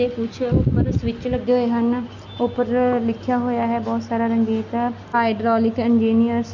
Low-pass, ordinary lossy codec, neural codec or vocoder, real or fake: 7.2 kHz; none; none; real